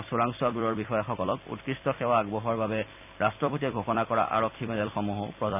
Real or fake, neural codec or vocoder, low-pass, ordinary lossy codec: fake; vocoder, 44.1 kHz, 128 mel bands every 256 samples, BigVGAN v2; 3.6 kHz; none